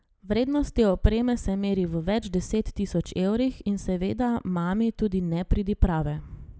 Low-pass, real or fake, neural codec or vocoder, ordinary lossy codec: none; real; none; none